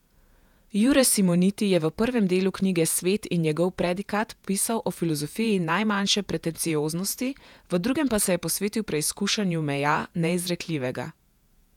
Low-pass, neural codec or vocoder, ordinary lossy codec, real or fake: 19.8 kHz; vocoder, 48 kHz, 128 mel bands, Vocos; none; fake